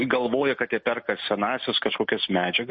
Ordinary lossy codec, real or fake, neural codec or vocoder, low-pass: MP3, 32 kbps; real; none; 10.8 kHz